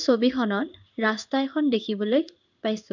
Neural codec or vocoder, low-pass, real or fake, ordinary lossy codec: codec, 16 kHz in and 24 kHz out, 1 kbps, XY-Tokenizer; 7.2 kHz; fake; none